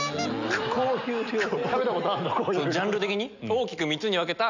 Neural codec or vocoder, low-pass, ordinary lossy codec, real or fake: none; 7.2 kHz; none; real